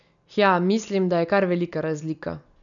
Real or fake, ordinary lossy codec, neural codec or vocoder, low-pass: real; none; none; 7.2 kHz